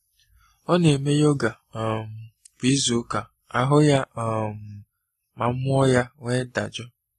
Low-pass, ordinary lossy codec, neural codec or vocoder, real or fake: 19.8 kHz; AAC, 32 kbps; none; real